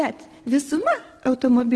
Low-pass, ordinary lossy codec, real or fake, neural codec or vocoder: 10.8 kHz; Opus, 16 kbps; real; none